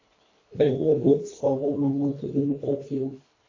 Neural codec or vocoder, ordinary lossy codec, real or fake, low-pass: codec, 24 kHz, 1.5 kbps, HILCodec; AAC, 32 kbps; fake; 7.2 kHz